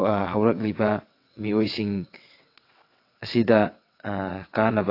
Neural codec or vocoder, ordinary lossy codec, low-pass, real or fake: vocoder, 22.05 kHz, 80 mel bands, WaveNeXt; AAC, 32 kbps; 5.4 kHz; fake